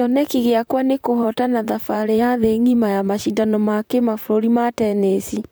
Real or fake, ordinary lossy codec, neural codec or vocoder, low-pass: fake; none; vocoder, 44.1 kHz, 128 mel bands, Pupu-Vocoder; none